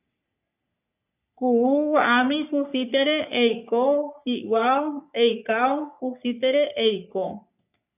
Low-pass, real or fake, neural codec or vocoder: 3.6 kHz; fake; codec, 44.1 kHz, 3.4 kbps, Pupu-Codec